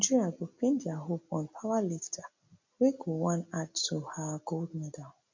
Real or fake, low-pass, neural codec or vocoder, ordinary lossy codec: real; 7.2 kHz; none; MP3, 48 kbps